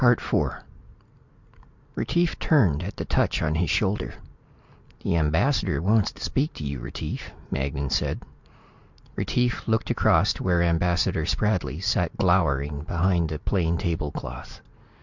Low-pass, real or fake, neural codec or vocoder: 7.2 kHz; real; none